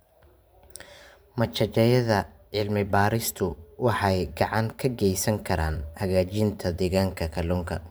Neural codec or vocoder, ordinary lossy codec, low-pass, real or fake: vocoder, 44.1 kHz, 128 mel bands every 512 samples, BigVGAN v2; none; none; fake